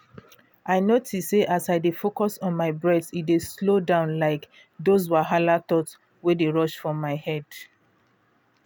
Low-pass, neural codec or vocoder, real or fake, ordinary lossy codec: none; none; real; none